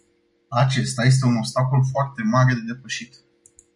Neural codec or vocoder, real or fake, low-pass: none; real; 10.8 kHz